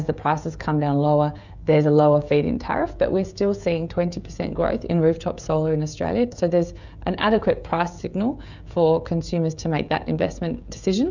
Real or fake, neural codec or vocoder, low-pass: fake; codec, 16 kHz, 16 kbps, FreqCodec, smaller model; 7.2 kHz